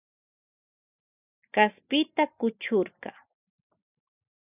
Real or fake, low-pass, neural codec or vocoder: real; 3.6 kHz; none